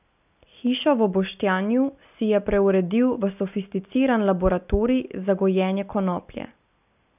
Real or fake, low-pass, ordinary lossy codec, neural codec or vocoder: real; 3.6 kHz; none; none